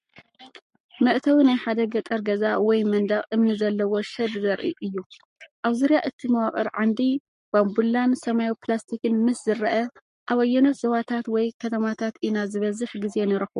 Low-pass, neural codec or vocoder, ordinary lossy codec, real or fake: 14.4 kHz; codec, 44.1 kHz, 7.8 kbps, Pupu-Codec; MP3, 48 kbps; fake